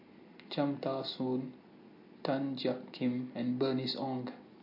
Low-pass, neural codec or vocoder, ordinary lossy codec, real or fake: 5.4 kHz; none; MP3, 32 kbps; real